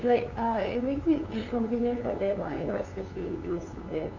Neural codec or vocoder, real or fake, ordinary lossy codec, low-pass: codec, 16 kHz, 2 kbps, FunCodec, trained on LibriTTS, 25 frames a second; fake; none; 7.2 kHz